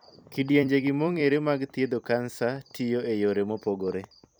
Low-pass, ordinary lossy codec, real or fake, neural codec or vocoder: none; none; fake; vocoder, 44.1 kHz, 128 mel bands every 256 samples, BigVGAN v2